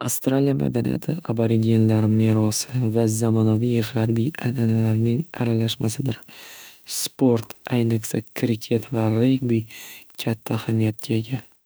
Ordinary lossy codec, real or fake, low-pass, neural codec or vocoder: none; fake; none; autoencoder, 48 kHz, 32 numbers a frame, DAC-VAE, trained on Japanese speech